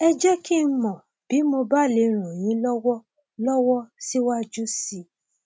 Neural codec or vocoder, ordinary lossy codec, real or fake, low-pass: none; none; real; none